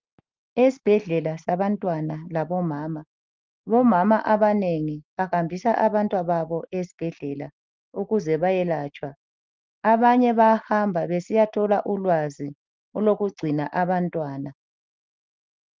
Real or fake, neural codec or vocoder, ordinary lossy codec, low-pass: real; none; Opus, 32 kbps; 7.2 kHz